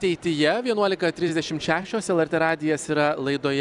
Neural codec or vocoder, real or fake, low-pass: none; real; 10.8 kHz